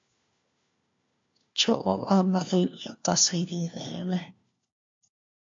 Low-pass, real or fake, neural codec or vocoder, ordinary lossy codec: 7.2 kHz; fake; codec, 16 kHz, 1 kbps, FunCodec, trained on LibriTTS, 50 frames a second; MP3, 48 kbps